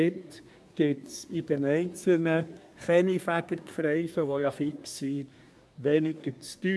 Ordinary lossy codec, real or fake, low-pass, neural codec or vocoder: none; fake; none; codec, 24 kHz, 1 kbps, SNAC